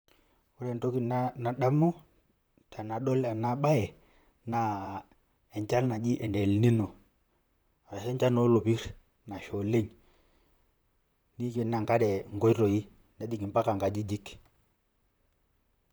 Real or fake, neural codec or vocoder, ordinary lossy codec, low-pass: fake; vocoder, 44.1 kHz, 128 mel bands, Pupu-Vocoder; none; none